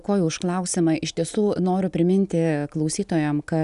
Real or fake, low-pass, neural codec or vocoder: real; 10.8 kHz; none